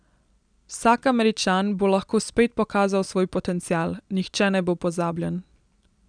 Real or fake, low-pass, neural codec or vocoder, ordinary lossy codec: real; 9.9 kHz; none; none